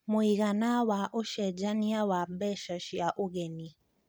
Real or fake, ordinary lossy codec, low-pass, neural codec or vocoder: real; none; none; none